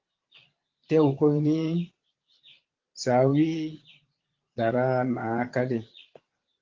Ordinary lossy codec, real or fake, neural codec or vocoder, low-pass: Opus, 16 kbps; fake; vocoder, 22.05 kHz, 80 mel bands, Vocos; 7.2 kHz